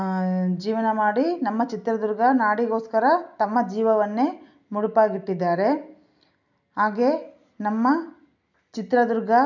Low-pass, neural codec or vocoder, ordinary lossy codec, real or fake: 7.2 kHz; none; none; real